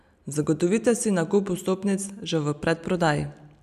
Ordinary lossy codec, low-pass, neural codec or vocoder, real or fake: none; 14.4 kHz; none; real